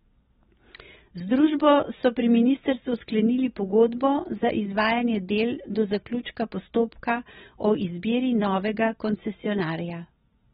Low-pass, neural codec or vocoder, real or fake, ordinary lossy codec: 19.8 kHz; none; real; AAC, 16 kbps